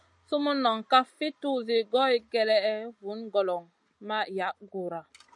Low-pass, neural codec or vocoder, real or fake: 10.8 kHz; none; real